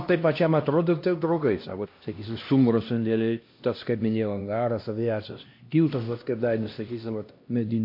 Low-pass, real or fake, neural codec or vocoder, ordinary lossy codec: 5.4 kHz; fake; codec, 16 kHz, 1 kbps, X-Codec, HuBERT features, trained on LibriSpeech; MP3, 32 kbps